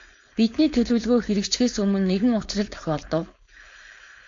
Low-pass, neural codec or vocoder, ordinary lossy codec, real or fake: 7.2 kHz; codec, 16 kHz, 4.8 kbps, FACodec; AAC, 48 kbps; fake